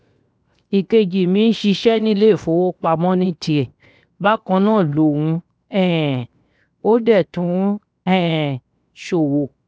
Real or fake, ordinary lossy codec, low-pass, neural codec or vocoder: fake; none; none; codec, 16 kHz, 0.7 kbps, FocalCodec